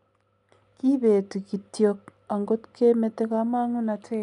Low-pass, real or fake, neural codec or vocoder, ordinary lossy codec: 10.8 kHz; real; none; none